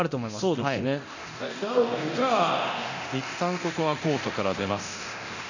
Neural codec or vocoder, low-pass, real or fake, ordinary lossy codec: codec, 24 kHz, 0.9 kbps, DualCodec; 7.2 kHz; fake; none